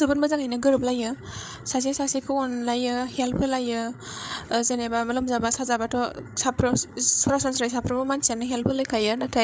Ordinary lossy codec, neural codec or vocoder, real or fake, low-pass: none; codec, 16 kHz, 8 kbps, FreqCodec, larger model; fake; none